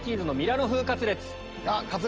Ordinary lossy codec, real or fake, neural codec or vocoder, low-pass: Opus, 24 kbps; real; none; 7.2 kHz